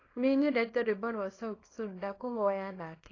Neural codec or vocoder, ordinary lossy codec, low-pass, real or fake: codec, 24 kHz, 0.9 kbps, WavTokenizer, small release; AAC, 32 kbps; 7.2 kHz; fake